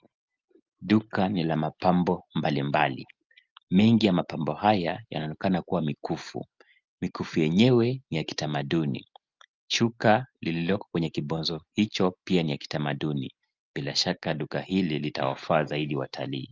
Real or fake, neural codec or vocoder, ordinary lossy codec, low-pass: real; none; Opus, 24 kbps; 7.2 kHz